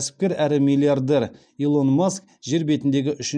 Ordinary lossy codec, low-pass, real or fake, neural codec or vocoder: none; 9.9 kHz; real; none